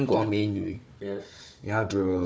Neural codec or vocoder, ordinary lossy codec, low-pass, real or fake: codec, 16 kHz, 4 kbps, FunCodec, trained on Chinese and English, 50 frames a second; none; none; fake